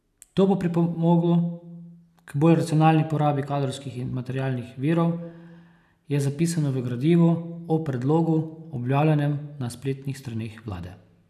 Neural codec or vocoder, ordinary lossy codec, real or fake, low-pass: none; none; real; 14.4 kHz